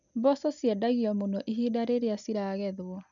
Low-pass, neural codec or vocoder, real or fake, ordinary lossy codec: 7.2 kHz; none; real; none